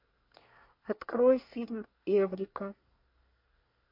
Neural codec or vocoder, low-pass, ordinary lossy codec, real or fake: codec, 24 kHz, 1 kbps, SNAC; 5.4 kHz; MP3, 32 kbps; fake